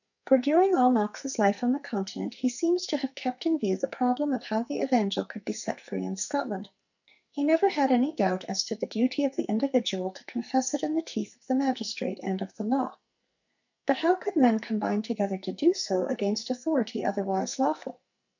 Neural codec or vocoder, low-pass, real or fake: codec, 44.1 kHz, 2.6 kbps, SNAC; 7.2 kHz; fake